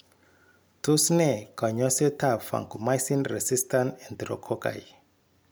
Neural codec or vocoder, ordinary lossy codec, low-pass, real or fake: none; none; none; real